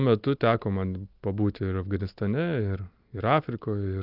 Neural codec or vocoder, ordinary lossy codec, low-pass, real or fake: none; Opus, 32 kbps; 5.4 kHz; real